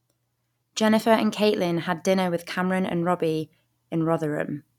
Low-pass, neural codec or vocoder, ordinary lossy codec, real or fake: 19.8 kHz; none; none; real